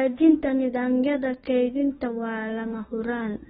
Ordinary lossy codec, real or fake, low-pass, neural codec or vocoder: AAC, 16 kbps; fake; 19.8 kHz; autoencoder, 48 kHz, 32 numbers a frame, DAC-VAE, trained on Japanese speech